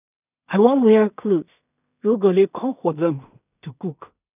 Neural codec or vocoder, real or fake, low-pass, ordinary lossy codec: codec, 16 kHz in and 24 kHz out, 0.4 kbps, LongCat-Audio-Codec, two codebook decoder; fake; 3.6 kHz; none